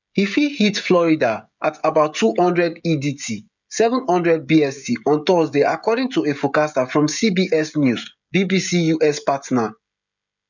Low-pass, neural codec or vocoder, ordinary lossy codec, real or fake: 7.2 kHz; codec, 16 kHz, 16 kbps, FreqCodec, smaller model; none; fake